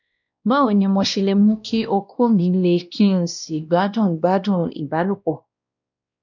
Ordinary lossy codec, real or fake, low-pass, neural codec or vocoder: none; fake; 7.2 kHz; codec, 16 kHz, 1 kbps, X-Codec, WavLM features, trained on Multilingual LibriSpeech